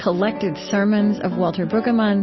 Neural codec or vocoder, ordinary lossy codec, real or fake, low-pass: none; MP3, 24 kbps; real; 7.2 kHz